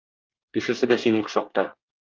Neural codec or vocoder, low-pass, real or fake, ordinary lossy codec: codec, 24 kHz, 1 kbps, SNAC; 7.2 kHz; fake; Opus, 24 kbps